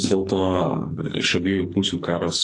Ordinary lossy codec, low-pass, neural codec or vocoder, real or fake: AAC, 48 kbps; 10.8 kHz; codec, 44.1 kHz, 2.6 kbps, SNAC; fake